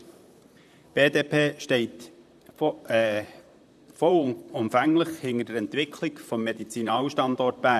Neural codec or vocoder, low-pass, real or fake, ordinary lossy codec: vocoder, 44.1 kHz, 128 mel bands, Pupu-Vocoder; 14.4 kHz; fake; none